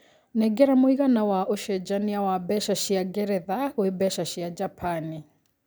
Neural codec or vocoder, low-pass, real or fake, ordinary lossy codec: none; none; real; none